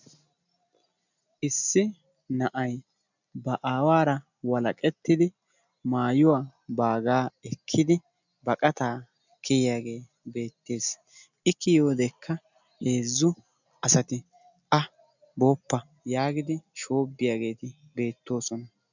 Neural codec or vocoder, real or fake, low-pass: none; real; 7.2 kHz